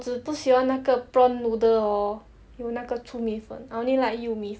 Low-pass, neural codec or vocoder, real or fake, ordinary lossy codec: none; none; real; none